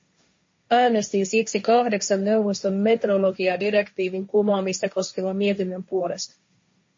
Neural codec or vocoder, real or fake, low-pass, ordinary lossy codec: codec, 16 kHz, 1.1 kbps, Voila-Tokenizer; fake; 7.2 kHz; MP3, 32 kbps